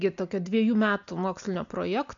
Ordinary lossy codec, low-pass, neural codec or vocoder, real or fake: MP3, 64 kbps; 7.2 kHz; none; real